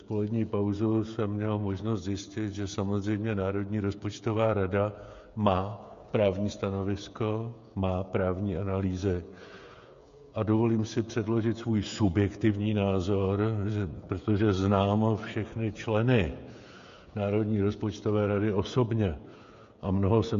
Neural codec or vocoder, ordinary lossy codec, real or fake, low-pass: codec, 16 kHz, 16 kbps, FreqCodec, smaller model; MP3, 48 kbps; fake; 7.2 kHz